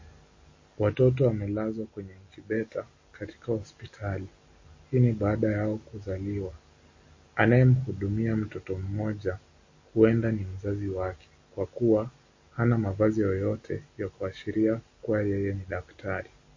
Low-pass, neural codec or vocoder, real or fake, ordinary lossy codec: 7.2 kHz; none; real; MP3, 32 kbps